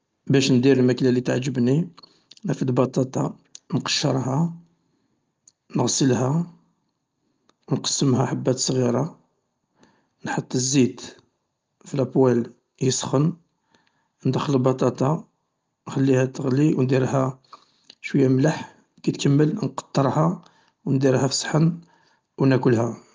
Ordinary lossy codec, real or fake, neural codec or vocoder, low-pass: Opus, 24 kbps; real; none; 7.2 kHz